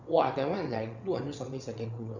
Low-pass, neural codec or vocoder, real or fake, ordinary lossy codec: 7.2 kHz; vocoder, 22.05 kHz, 80 mel bands, WaveNeXt; fake; none